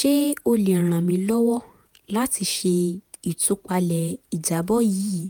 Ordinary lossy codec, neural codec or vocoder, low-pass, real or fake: none; vocoder, 48 kHz, 128 mel bands, Vocos; none; fake